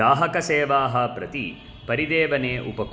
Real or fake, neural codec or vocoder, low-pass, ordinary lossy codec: real; none; none; none